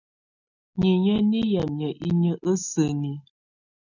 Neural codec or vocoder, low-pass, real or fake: none; 7.2 kHz; real